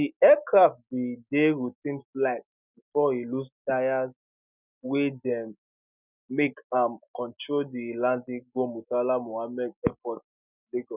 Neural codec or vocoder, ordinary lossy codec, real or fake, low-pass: none; none; real; 3.6 kHz